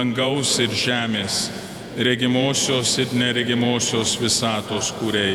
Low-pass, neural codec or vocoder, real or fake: 19.8 kHz; vocoder, 48 kHz, 128 mel bands, Vocos; fake